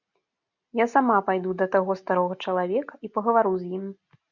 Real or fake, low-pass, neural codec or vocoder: real; 7.2 kHz; none